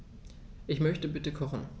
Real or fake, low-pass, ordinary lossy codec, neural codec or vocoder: real; none; none; none